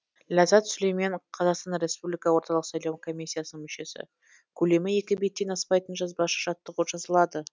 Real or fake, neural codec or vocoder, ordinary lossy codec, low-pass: real; none; none; none